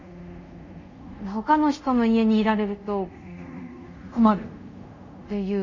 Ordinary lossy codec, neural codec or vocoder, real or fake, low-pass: MP3, 32 kbps; codec, 24 kHz, 0.5 kbps, DualCodec; fake; 7.2 kHz